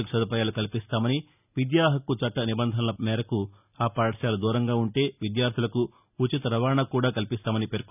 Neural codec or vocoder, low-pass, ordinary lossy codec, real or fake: none; 3.6 kHz; none; real